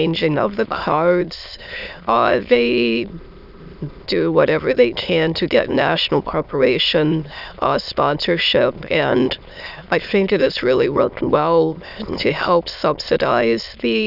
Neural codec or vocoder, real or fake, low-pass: autoencoder, 22.05 kHz, a latent of 192 numbers a frame, VITS, trained on many speakers; fake; 5.4 kHz